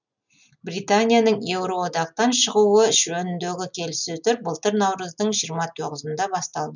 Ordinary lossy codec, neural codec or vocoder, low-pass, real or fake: none; none; 7.2 kHz; real